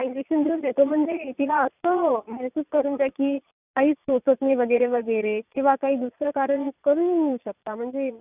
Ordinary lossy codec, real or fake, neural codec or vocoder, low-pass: none; fake; vocoder, 22.05 kHz, 80 mel bands, Vocos; 3.6 kHz